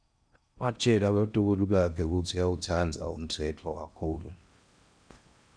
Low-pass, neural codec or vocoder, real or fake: 9.9 kHz; codec, 16 kHz in and 24 kHz out, 0.6 kbps, FocalCodec, streaming, 4096 codes; fake